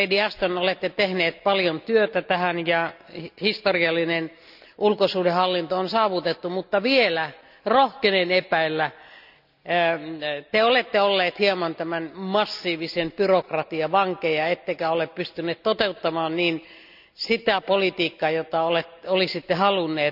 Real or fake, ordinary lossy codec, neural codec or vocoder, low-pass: real; none; none; 5.4 kHz